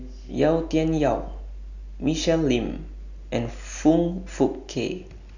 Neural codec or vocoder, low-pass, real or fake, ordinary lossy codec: none; 7.2 kHz; real; none